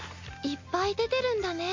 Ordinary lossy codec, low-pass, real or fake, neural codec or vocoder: MP3, 48 kbps; 7.2 kHz; real; none